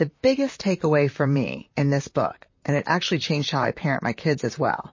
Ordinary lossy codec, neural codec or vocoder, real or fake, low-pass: MP3, 32 kbps; vocoder, 44.1 kHz, 128 mel bands, Pupu-Vocoder; fake; 7.2 kHz